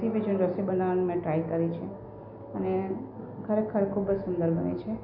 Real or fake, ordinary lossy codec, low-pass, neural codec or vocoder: real; none; 5.4 kHz; none